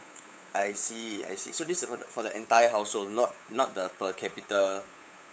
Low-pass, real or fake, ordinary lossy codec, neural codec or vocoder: none; fake; none; codec, 16 kHz, 8 kbps, FunCodec, trained on LibriTTS, 25 frames a second